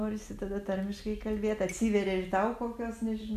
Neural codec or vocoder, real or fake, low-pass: none; real; 14.4 kHz